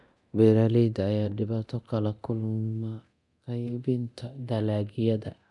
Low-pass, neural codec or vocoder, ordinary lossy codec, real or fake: 10.8 kHz; codec, 24 kHz, 0.9 kbps, DualCodec; none; fake